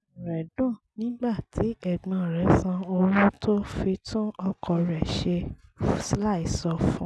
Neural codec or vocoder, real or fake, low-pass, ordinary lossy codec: none; real; none; none